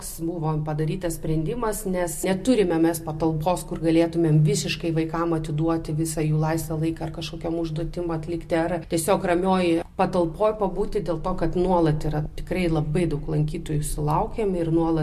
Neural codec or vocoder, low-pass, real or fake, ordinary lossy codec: none; 14.4 kHz; real; MP3, 64 kbps